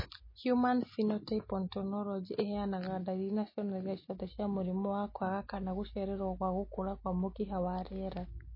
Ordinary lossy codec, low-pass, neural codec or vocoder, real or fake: MP3, 24 kbps; 5.4 kHz; none; real